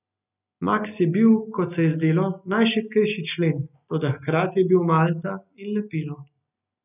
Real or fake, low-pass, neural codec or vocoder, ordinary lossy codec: real; 3.6 kHz; none; none